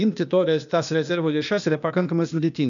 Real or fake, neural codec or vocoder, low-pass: fake; codec, 16 kHz, 0.8 kbps, ZipCodec; 7.2 kHz